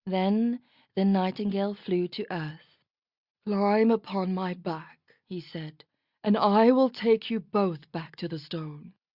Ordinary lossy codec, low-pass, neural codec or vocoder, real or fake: Opus, 64 kbps; 5.4 kHz; none; real